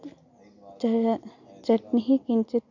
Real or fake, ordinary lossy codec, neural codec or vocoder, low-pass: real; none; none; 7.2 kHz